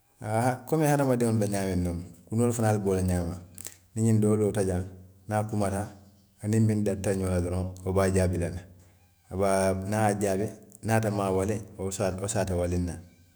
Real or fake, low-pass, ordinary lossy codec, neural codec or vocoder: fake; none; none; autoencoder, 48 kHz, 128 numbers a frame, DAC-VAE, trained on Japanese speech